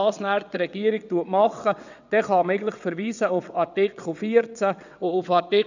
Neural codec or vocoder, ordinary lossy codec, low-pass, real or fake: vocoder, 22.05 kHz, 80 mel bands, WaveNeXt; none; 7.2 kHz; fake